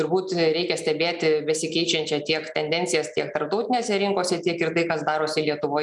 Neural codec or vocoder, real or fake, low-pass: none; real; 10.8 kHz